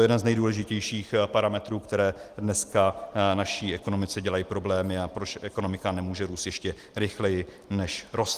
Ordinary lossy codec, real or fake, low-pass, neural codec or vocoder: Opus, 24 kbps; real; 14.4 kHz; none